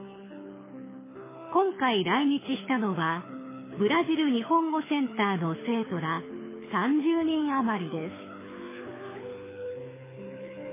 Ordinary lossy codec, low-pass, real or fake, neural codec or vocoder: MP3, 16 kbps; 3.6 kHz; fake; codec, 24 kHz, 6 kbps, HILCodec